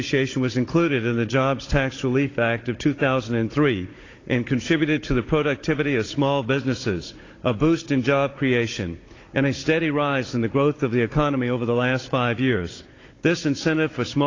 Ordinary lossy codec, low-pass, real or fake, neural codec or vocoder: AAC, 32 kbps; 7.2 kHz; fake; codec, 16 kHz, 16 kbps, FunCodec, trained on Chinese and English, 50 frames a second